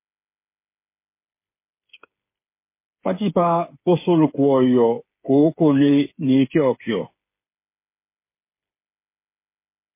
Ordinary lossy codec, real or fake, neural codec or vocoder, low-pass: MP3, 24 kbps; fake; codec, 16 kHz, 8 kbps, FreqCodec, smaller model; 3.6 kHz